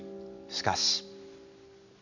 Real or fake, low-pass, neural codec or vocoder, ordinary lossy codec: real; 7.2 kHz; none; none